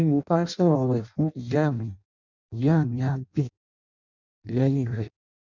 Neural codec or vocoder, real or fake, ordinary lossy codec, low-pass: codec, 16 kHz in and 24 kHz out, 0.6 kbps, FireRedTTS-2 codec; fake; none; 7.2 kHz